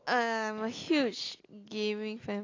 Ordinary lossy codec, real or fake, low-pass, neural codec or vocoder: AAC, 32 kbps; real; 7.2 kHz; none